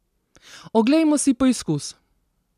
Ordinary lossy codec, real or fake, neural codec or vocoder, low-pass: none; real; none; 14.4 kHz